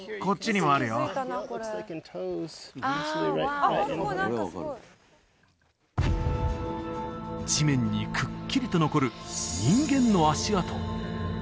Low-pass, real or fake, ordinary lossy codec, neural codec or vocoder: none; real; none; none